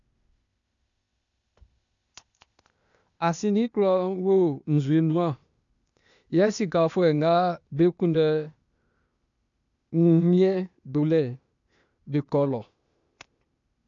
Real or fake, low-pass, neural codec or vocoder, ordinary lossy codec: fake; 7.2 kHz; codec, 16 kHz, 0.8 kbps, ZipCodec; none